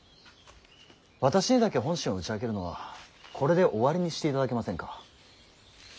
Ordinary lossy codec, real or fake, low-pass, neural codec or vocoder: none; real; none; none